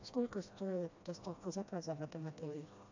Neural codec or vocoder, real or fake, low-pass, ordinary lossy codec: codec, 16 kHz, 1 kbps, FreqCodec, smaller model; fake; 7.2 kHz; AAC, 48 kbps